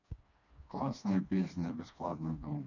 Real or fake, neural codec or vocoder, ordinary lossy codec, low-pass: fake; codec, 16 kHz, 2 kbps, FreqCodec, smaller model; none; 7.2 kHz